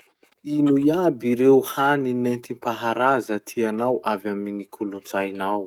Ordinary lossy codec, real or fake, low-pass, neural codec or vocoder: none; fake; 19.8 kHz; codec, 44.1 kHz, 7.8 kbps, DAC